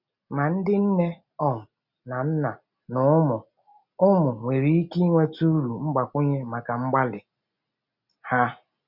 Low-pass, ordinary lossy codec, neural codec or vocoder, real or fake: 5.4 kHz; none; none; real